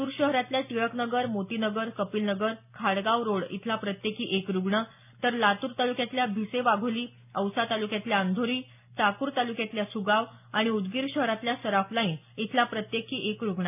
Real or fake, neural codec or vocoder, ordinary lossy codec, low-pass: real; none; none; 3.6 kHz